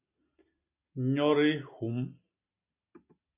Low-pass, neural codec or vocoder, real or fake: 3.6 kHz; none; real